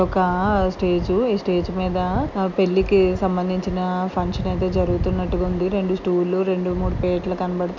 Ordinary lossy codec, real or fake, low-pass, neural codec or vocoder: none; real; 7.2 kHz; none